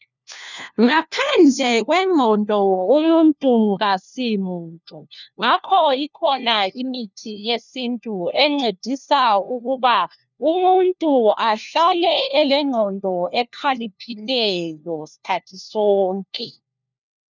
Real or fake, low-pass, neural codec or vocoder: fake; 7.2 kHz; codec, 16 kHz, 1 kbps, FunCodec, trained on LibriTTS, 50 frames a second